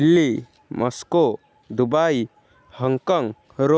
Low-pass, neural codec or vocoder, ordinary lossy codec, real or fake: none; none; none; real